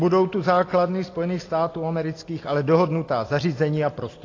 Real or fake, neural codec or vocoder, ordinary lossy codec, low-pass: real; none; AAC, 32 kbps; 7.2 kHz